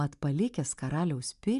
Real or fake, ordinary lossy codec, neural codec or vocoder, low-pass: real; MP3, 96 kbps; none; 10.8 kHz